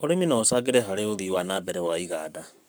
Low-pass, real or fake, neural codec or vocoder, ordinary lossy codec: none; fake; codec, 44.1 kHz, 7.8 kbps, Pupu-Codec; none